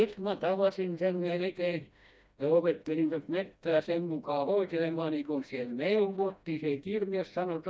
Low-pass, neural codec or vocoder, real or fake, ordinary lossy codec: none; codec, 16 kHz, 1 kbps, FreqCodec, smaller model; fake; none